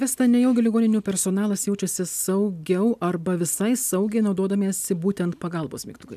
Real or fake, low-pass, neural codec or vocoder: real; 14.4 kHz; none